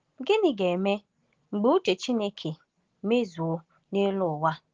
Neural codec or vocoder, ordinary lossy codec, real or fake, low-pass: none; Opus, 16 kbps; real; 7.2 kHz